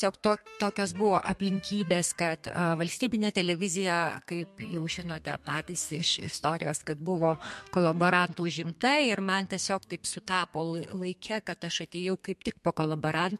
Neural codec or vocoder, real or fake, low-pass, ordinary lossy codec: codec, 32 kHz, 1.9 kbps, SNAC; fake; 14.4 kHz; MP3, 64 kbps